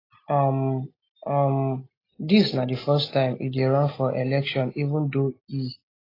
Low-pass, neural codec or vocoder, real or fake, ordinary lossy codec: 5.4 kHz; none; real; AAC, 24 kbps